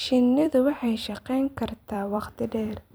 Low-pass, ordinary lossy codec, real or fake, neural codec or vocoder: none; none; fake; vocoder, 44.1 kHz, 128 mel bands every 256 samples, BigVGAN v2